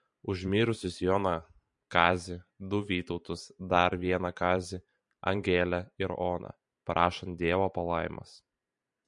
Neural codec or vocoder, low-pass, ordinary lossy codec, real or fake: vocoder, 44.1 kHz, 128 mel bands every 512 samples, BigVGAN v2; 10.8 kHz; MP3, 48 kbps; fake